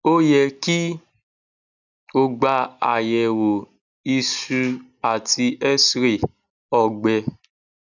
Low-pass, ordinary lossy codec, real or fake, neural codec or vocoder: 7.2 kHz; none; real; none